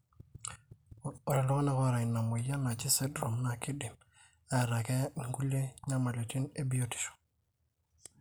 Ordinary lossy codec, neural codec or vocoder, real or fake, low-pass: none; none; real; none